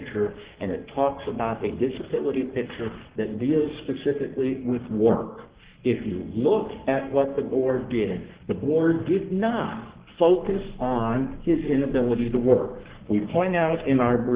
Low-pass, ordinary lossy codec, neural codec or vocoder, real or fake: 3.6 kHz; Opus, 16 kbps; codec, 44.1 kHz, 3.4 kbps, Pupu-Codec; fake